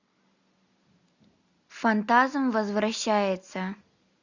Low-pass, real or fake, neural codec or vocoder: 7.2 kHz; real; none